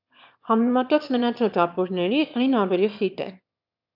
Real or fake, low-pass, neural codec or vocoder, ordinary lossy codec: fake; 5.4 kHz; autoencoder, 22.05 kHz, a latent of 192 numbers a frame, VITS, trained on one speaker; AAC, 48 kbps